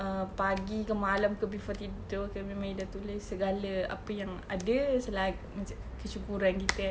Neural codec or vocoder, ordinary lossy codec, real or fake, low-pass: none; none; real; none